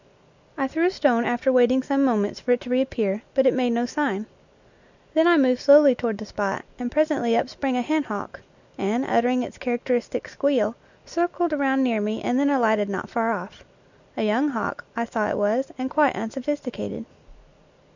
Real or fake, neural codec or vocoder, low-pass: real; none; 7.2 kHz